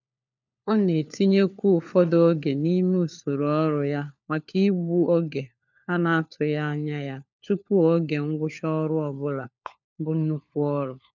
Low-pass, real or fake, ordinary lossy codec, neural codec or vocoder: 7.2 kHz; fake; none; codec, 16 kHz, 4 kbps, FunCodec, trained on LibriTTS, 50 frames a second